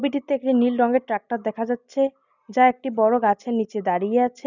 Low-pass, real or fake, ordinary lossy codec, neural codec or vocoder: 7.2 kHz; real; none; none